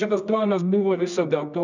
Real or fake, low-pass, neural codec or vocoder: fake; 7.2 kHz; codec, 24 kHz, 0.9 kbps, WavTokenizer, medium music audio release